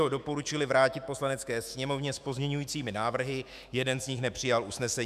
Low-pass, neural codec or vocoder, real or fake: 14.4 kHz; autoencoder, 48 kHz, 128 numbers a frame, DAC-VAE, trained on Japanese speech; fake